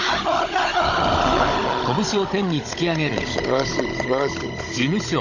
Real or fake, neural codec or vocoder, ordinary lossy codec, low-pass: fake; codec, 16 kHz, 16 kbps, FunCodec, trained on Chinese and English, 50 frames a second; none; 7.2 kHz